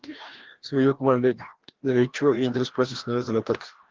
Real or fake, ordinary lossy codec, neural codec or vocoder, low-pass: fake; Opus, 16 kbps; codec, 16 kHz, 1 kbps, FreqCodec, larger model; 7.2 kHz